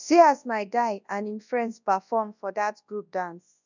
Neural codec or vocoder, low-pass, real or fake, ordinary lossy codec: codec, 24 kHz, 0.9 kbps, WavTokenizer, large speech release; 7.2 kHz; fake; none